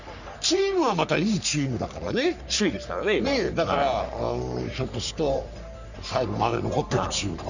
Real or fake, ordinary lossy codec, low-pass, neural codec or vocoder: fake; none; 7.2 kHz; codec, 44.1 kHz, 3.4 kbps, Pupu-Codec